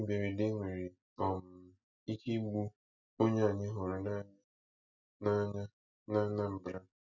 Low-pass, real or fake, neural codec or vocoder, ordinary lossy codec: 7.2 kHz; real; none; none